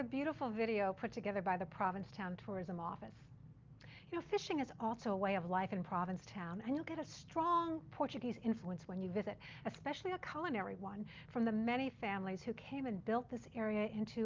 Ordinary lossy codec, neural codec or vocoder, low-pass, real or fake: Opus, 24 kbps; none; 7.2 kHz; real